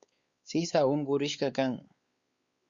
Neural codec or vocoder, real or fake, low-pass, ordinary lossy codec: codec, 16 kHz, 4 kbps, X-Codec, WavLM features, trained on Multilingual LibriSpeech; fake; 7.2 kHz; Opus, 64 kbps